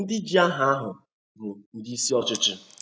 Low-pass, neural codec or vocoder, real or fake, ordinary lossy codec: none; none; real; none